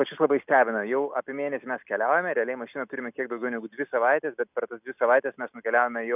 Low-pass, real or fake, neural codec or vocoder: 3.6 kHz; real; none